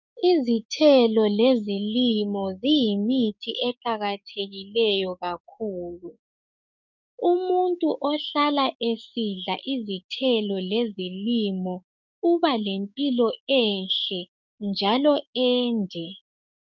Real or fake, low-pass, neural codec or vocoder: fake; 7.2 kHz; codec, 16 kHz, 6 kbps, DAC